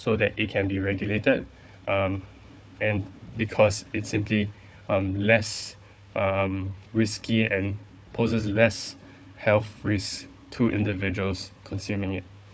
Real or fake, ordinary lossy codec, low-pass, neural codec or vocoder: fake; none; none; codec, 16 kHz, 4 kbps, FunCodec, trained on Chinese and English, 50 frames a second